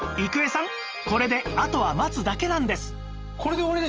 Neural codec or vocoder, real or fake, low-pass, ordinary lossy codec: none; real; 7.2 kHz; Opus, 24 kbps